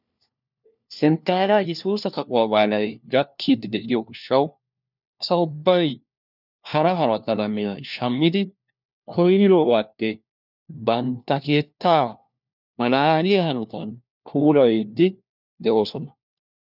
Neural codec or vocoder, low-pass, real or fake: codec, 16 kHz, 1 kbps, FunCodec, trained on LibriTTS, 50 frames a second; 5.4 kHz; fake